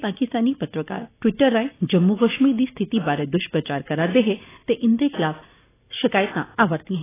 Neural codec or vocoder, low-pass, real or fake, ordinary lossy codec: none; 3.6 kHz; real; AAC, 16 kbps